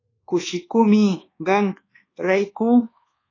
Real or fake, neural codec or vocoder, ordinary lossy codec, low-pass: fake; codec, 24 kHz, 1.2 kbps, DualCodec; AAC, 32 kbps; 7.2 kHz